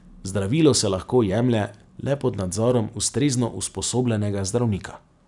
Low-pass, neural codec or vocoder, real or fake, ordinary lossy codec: 10.8 kHz; none; real; none